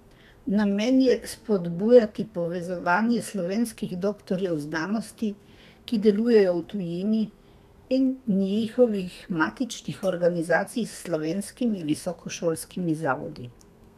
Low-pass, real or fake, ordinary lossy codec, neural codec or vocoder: 14.4 kHz; fake; none; codec, 32 kHz, 1.9 kbps, SNAC